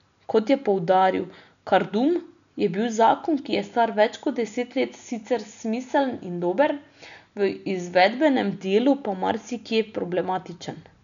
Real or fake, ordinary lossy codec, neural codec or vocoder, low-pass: real; none; none; 7.2 kHz